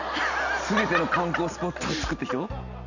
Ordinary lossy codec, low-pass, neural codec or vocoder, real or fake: none; 7.2 kHz; none; real